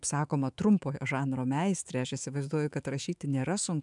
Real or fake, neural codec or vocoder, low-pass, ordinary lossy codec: fake; autoencoder, 48 kHz, 128 numbers a frame, DAC-VAE, trained on Japanese speech; 10.8 kHz; MP3, 96 kbps